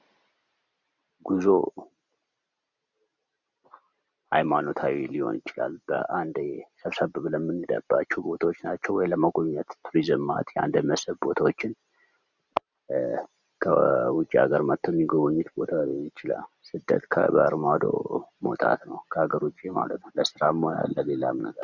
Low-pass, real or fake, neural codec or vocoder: 7.2 kHz; real; none